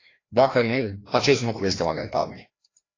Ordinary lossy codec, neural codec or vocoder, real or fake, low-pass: AAC, 32 kbps; codec, 16 kHz, 1 kbps, FreqCodec, larger model; fake; 7.2 kHz